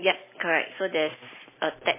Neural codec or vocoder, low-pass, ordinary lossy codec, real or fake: none; 3.6 kHz; MP3, 16 kbps; real